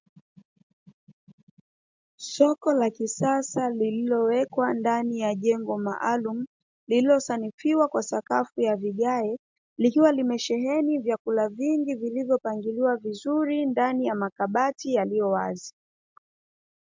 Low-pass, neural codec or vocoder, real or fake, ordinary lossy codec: 7.2 kHz; none; real; MP3, 64 kbps